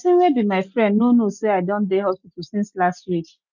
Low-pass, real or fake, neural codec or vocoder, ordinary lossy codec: 7.2 kHz; real; none; none